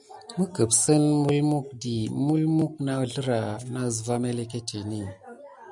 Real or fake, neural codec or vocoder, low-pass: real; none; 10.8 kHz